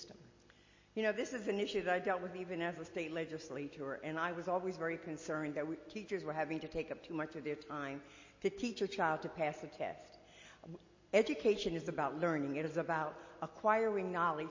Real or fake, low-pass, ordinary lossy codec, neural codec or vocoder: real; 7.2 kHz; MP3, 32 kbps; none